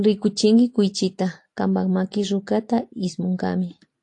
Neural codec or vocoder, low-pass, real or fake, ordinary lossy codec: none; 10.8 kHz; real; AAC, 48 kbps